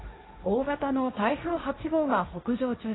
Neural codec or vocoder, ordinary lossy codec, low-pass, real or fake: codec, 16 kHz, 1.1 kbps, Voila-Tokenizer; AAC, 16 kbps; 7.2 kHz; fake